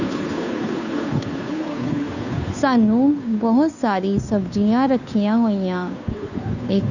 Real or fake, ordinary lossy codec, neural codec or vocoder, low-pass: fake; none; codec, 16 kHz, 2 kbps, FunCodec, trained on Chinese and English, 25 frames a second; 7.2 kHz